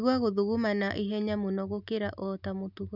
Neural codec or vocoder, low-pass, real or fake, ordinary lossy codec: none; 5.4 kHz; real; none